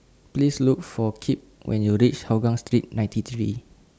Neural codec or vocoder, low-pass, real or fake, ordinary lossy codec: none; none; real; none